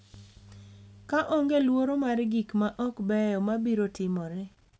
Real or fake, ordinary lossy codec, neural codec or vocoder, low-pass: real; none; none; none